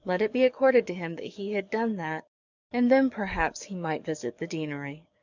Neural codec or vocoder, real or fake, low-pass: codec, 44.1 kHz, 7.8 kbps, DAC; fake; 7.2 kHz